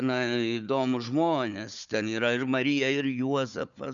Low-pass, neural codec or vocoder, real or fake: 7.2 kHz; codec, 16 kHz, 4 kbps, FunCodec, trained on Chinese and English, 50 frames a second; fake